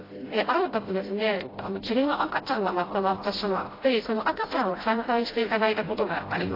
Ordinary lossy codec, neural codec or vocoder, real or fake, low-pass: AAC, 24 kbps; codec, 16 kHz, 0.5 kbps, FreqCodec, smaller model; fake; 5.4 kHz